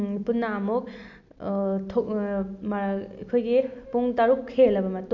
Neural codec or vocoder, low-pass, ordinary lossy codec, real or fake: none; 7.2 kHz; none; real